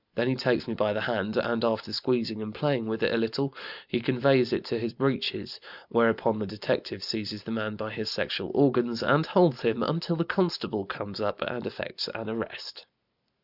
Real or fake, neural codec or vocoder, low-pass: real; none; 5.4 kHz